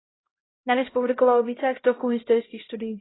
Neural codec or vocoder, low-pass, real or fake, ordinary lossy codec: codec, 16 kHz, 0.5 kbps, X-Codec, HuBERT features, trained on LibriSpeech; 7.2 kHz; fake; AAC, 16 kbps